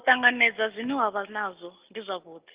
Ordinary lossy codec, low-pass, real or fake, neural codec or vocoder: Opus, 24 kbps; 3.6 kHz; real; none